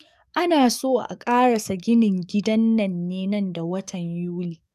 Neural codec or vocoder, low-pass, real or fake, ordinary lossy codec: codec, 44.1 kHz, 7.8 kbps, DAC; 14.4 kHz; fake; none